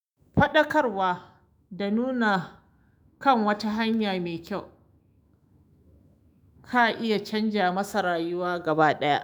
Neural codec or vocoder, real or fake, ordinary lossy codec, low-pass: autoencoder, 48 kHz, 128 numbers a frame, DAC-VAE, trained on Japanese speech; fake; none; none